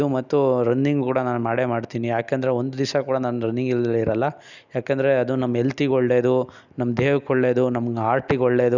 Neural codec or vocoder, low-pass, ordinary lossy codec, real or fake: none; 7.2 kHz; none; real